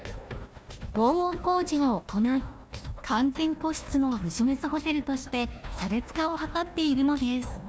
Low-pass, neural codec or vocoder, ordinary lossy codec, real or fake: none; codec, 16 kHz, 1 kbps, FunCodec, trained on Chinese and English, 50 frames a second; none; fake